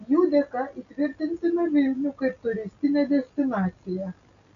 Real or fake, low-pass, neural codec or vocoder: real; 7.2 kHz; none